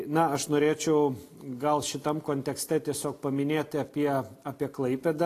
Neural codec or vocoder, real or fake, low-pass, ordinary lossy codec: none; real; 14.4 kHz; AAC, 48 kbps